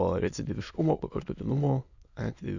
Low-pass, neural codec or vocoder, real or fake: 7.2 kHz; autoencoder, 22.05 kHz, a latent of 192 numbers a frame, VITS, trained on many speakers; fake